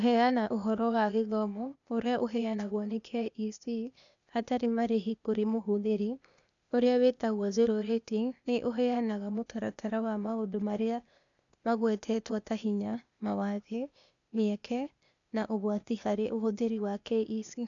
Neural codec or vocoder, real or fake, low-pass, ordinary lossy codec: codec, 16 kHz, 0.8 kbps, ZipCodec; fake; 7.2 kHz; none